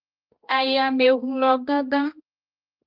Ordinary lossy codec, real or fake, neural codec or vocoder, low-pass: Opus, 24 kbps; fake; codec, 16 kHz, 1 kbps, X-Codec, HuBERT features, trained on balanced general audio; 5.4 kHz